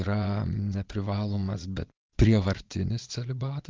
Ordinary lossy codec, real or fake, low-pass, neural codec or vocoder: Opus, 32 kbps; fake; 7.2 kHz; vocoder, 22.05 kHz, 80 mel bands, Vocos